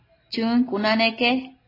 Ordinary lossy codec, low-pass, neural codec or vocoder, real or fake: AAC, 24 kbps; 5.4 kHz; none; real